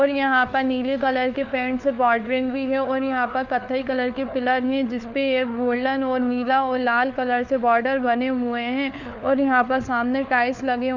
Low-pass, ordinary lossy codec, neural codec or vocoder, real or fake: 7.2 kHz; none; codec, 16 kHz, 4 kbps, FunCodec, trained on LibriTTS, 50 frames a second; fake